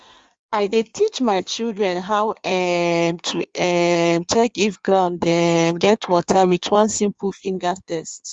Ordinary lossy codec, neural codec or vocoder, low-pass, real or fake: Opus, 64 kbps; codec, 16 kHz in and 24 kHz out, 1.1 kbps, FireRedTTS-2 codec; 9.9 kHz; fake